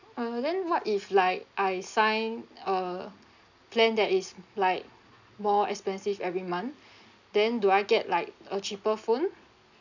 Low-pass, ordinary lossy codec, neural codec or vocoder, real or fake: 7.2 kHz; none; none; real